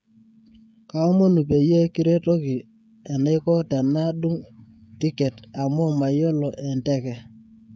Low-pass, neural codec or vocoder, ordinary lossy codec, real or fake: none; codec, 16 kHz, 16 kbps, FreqCodec, smaller model; none; fake